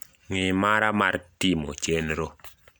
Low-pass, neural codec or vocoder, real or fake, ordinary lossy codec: none; none; real; none